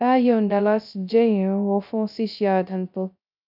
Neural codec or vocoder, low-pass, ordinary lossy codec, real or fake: codec, 16 kHz, 0.2 kbps, FocalCodec; 5.4 kHz; none; fake